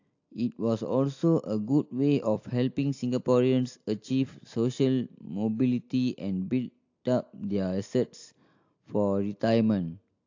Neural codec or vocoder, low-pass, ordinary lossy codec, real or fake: none; 7.2 kHz; AAC, 48 kbps; real